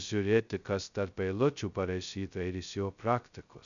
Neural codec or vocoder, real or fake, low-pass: codec, 16 kHz, 0.2 kbps, FocalCodec; fake; 7.2 kHz